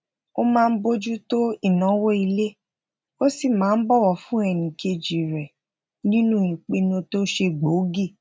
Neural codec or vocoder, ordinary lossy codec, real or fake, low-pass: none; none; real; none